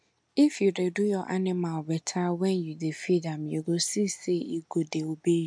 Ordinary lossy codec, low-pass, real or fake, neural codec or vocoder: MP3, 64 kbps; 9.9 kHz; real; none